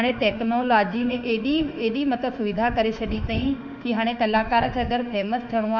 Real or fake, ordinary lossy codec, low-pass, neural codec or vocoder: fake; none; 7.2 kHz; autoencoder, 48 kHz, 32 numbers a frame, DAC-VAE, trained on Japanese speech